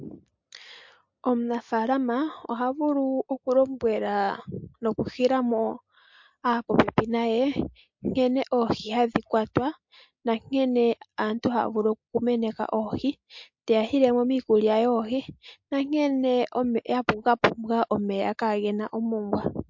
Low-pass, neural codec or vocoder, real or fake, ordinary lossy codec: 7.2 kHz; none; real; MP3, 48 kbps